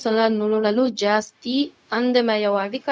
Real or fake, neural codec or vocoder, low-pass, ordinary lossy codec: fake; codec, 16 kHz, 0.4 kbps, LongCat-Audio-Codec; none; none